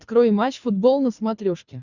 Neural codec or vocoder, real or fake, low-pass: codec, 24 kHz, 6 kbps, HILCodec; fake; 7.2 kHz